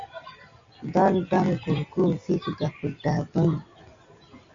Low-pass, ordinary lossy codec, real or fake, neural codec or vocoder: 7.2 kHz; Opus, 64 kbps; real; none